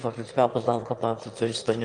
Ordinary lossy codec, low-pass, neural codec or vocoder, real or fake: Opus, 32 kbps; 9.9 kHz; autoencoder, 22.05 kHz, a latent of 192 numbers a frame, VITS, trained on one speaker; fake